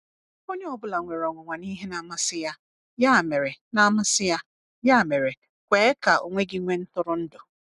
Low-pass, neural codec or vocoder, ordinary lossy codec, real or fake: 10.8 kHz; none; none; real